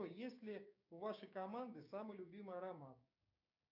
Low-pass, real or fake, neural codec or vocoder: 5.4 kHz; fake; codec, 16 kHz, 6 kbps, DAC